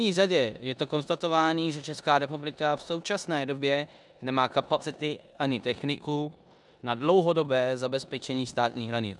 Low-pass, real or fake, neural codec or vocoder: 10.8 kHz; fake; codec, 16 kHz in and 24 kHz out, 0.9 kbps, LongCat-Audio-Codec, four codebook decoder